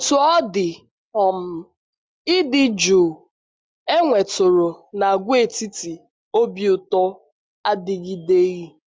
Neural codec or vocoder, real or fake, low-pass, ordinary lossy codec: none; real; none; none